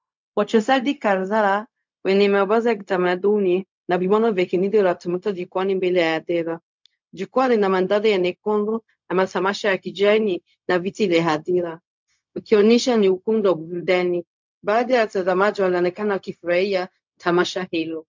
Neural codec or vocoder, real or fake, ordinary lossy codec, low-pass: codec, 16 kHz, 0.4 kbps, LongCat-Audio-Codec; fake; MP3, 64 kbps; 7.2 kHz